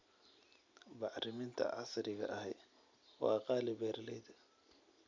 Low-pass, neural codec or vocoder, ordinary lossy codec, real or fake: 7.2 kHz; none; MP3, 64 kbps; real